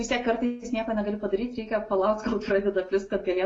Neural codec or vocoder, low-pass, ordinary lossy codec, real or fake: none; 9.9 kHz; AAC, 32 kbps; real